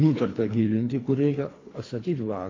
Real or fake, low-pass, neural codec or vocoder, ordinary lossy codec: fake; 7.2 kHz; codec, 24 kHz, 3 kbps, HILCodec; AAC, 32 kbps